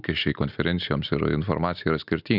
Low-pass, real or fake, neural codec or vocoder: 5.4 kHz; real; none